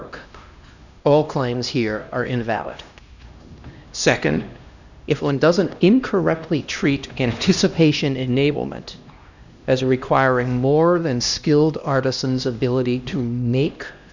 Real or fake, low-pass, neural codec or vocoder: fake; 7.2 kHz; codec, 16 kHz, 1 kbps, X-Codec, HuBERT features, trained on LibriSpeech